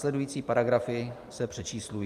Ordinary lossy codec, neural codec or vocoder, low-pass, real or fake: Opus, 32 kbps; none; 14.4 kHz; real